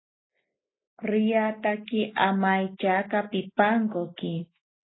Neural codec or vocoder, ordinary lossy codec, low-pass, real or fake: none; AAC, 16 kbps; 7.2 kHz; real